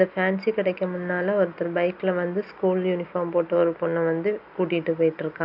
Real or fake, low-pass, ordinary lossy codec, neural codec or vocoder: real; 5.4 kHz; none; none